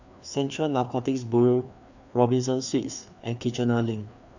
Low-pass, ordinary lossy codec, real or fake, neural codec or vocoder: 7.2 kHz; none; fake; codec, 16 kHz, 2 kbps, FreqCodec, larger model